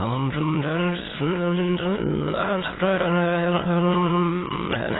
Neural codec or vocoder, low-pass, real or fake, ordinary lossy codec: autoencoder, 22.05 kHz, a latent of 192 numbers a frame, VITS, trained on many speakers; 7.2 kHz; fake; AAC, 16 kbps